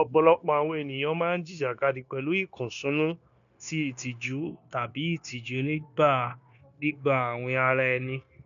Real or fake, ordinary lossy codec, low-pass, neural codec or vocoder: fake; none; 7.2 kHz; codec, 16 kHz, 0.9 kbps, LongCat-Audio-Codec